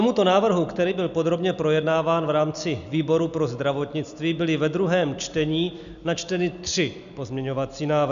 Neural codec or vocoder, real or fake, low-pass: none; real; 7.2 kHz